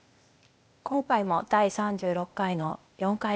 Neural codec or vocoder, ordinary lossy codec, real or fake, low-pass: codec, 16 kHz, 0.8 kbps, ZipCodec; none; fake; none